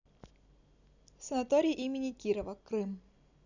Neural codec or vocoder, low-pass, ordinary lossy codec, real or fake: none; 7.2 kHz; none; real